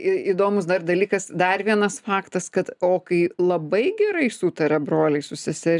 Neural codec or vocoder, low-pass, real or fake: none; 10.8 kHz; real